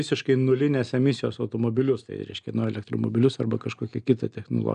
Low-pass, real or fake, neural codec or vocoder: 9.9 kHz; real; none